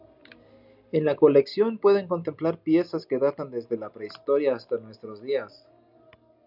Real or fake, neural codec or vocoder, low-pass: real; none; 5.4 kHz